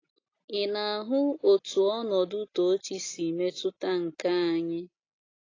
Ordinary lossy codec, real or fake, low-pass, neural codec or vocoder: AAC, 32 kbps; real; 7.2 kHz; none